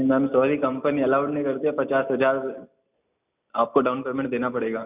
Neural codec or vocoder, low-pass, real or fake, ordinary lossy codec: none; 3.6 kHz; real; none